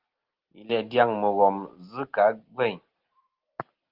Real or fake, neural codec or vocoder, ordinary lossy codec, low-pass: real; none; Opus, 16 kbps; 5.4 kHz